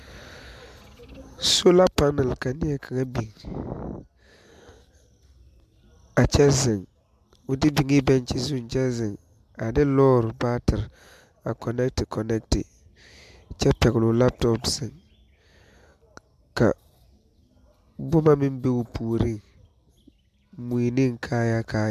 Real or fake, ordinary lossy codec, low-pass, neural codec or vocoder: real; MP3, 96 kbps; 14.4 kHz; none